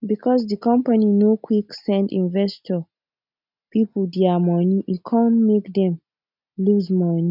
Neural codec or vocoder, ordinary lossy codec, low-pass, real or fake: none; none; 5.4 kHz; real